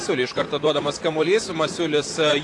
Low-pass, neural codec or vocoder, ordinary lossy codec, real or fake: 10.8 kHz; vocoder, 44.1 kHz, 128 mel bands, Pupu-Vocoder; AAC, 48 kbps; fake